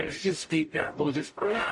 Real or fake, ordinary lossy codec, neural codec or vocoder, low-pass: fake; MP3, 48 kbps; codec, 44.1 kHz, 0.9 kbps, DAC; 10.8 kHz